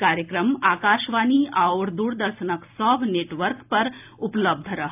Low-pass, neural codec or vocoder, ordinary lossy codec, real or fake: 3.6 kHz; none; AAC, 32 kbps; real